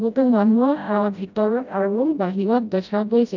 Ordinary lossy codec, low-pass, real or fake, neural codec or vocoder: none; 7.2 kHz; fake; codec, 16 kHz, 0.5 kbps, FreqCodec, smaller model